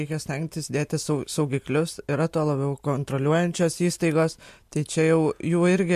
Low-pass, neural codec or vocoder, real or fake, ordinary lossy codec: 14.4 kHz; none; real; MP3, 64 kbps